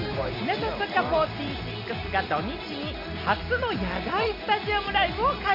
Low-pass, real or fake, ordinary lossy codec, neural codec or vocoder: 5.4 kHz; real; none; none